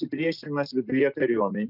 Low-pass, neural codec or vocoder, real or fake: 5.4 kHz; none; real